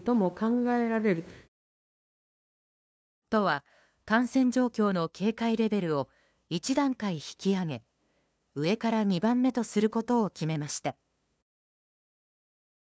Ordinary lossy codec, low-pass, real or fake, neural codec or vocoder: none; none; fake; codec, 16 kHz, 2 kbps, FunCodec, trained on LibriTTS, 25 frames a second